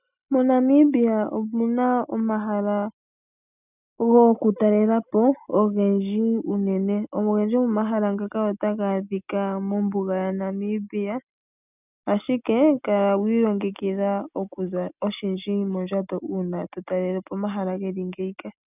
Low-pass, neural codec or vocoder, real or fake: 3.6 kHz; none; real